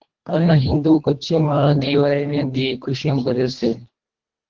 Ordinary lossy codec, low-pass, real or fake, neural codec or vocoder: Opus, 16 kbps; 7.2 kHz; fake; codec, 24 kHz, 1.5 kbps, HILCodec